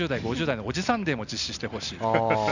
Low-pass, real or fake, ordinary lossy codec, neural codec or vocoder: 7.2 kHz; real; none; none